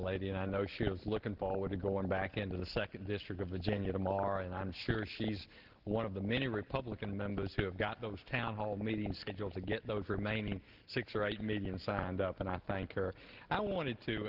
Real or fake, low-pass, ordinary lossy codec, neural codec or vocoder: real; 5.4 kHz; Opus, 16 kbps; none